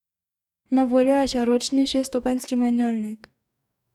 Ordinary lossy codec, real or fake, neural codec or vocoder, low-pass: none; fake; codec, 44.1 kHz, 2.6 kbps, DAC; 19.8 kHz